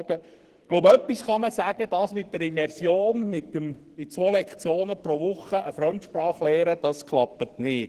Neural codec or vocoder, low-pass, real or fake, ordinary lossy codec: codec, 44.1 kHz, 2.6 kbps, SNAC; 14.4 kHz; fake; Opus, 16 kbps